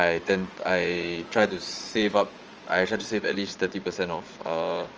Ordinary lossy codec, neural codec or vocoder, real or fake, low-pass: Opus, 24 kbps; vocoder, 22.05 kHz, 80 mel bands, Vocos; fake; 7.2 kHz